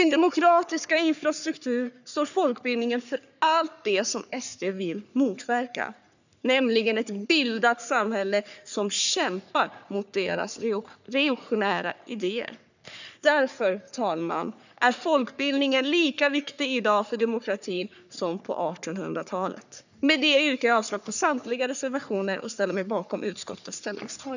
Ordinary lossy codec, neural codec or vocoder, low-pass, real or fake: none; codec, 44.1 kHz, 3.4 kbps, Pupu-Codec; 7.2 kHz; fake